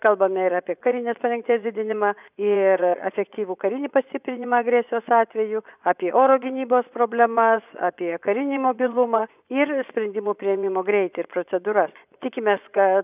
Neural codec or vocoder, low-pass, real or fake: vocoder, 24 kHz, 100 mel bands, Vocos; 3.6 kHz; fake